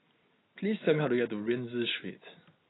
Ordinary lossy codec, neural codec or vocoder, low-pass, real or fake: AAC, 16 kbps; none; 7.2 kHz; real